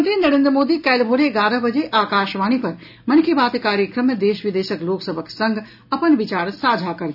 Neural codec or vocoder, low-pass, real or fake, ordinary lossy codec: none; 5.4 kHz; real; none